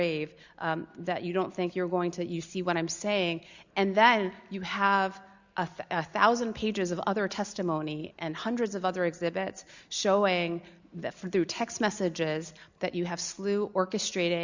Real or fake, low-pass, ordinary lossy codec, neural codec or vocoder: real; 7.2 kHz; Opus, 64 kbps; none